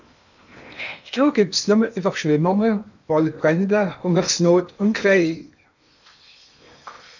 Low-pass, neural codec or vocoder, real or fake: 7.2 kHz; codec, 16 kHz in and 24 kHz out, 0.8 kbps, FocalCodec, streaming, 65536 codes; fake